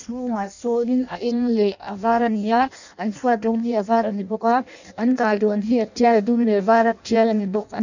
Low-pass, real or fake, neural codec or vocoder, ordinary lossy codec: 7.2 kHz; fake; codec, 16 kHz in and 24 kHz out, 0.6 kbps, FireRedTTS-2 codec; none